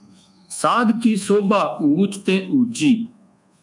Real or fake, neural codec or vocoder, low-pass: fake; codec, 24 kHz, 1.2 kbps, DualCodec; 10.8 kHz